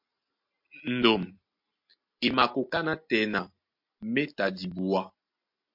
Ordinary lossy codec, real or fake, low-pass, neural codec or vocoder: MP3, 48 kbps; real; 5.4 kHz; none